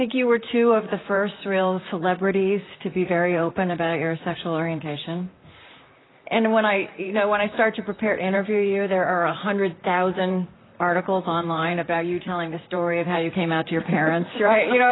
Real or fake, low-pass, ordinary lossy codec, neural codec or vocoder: fake; 7.2 kHz; AAC, 16 kbps; vocoder, 44.1 kHz, 128 mel bands, Pupu-Vocoder